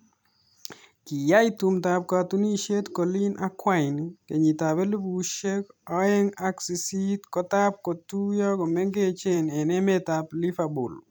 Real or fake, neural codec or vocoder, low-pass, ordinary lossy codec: fake; vocoder, 44.1 kHz, 128 mel bands every 256 samples, BigVGAN v2; none; none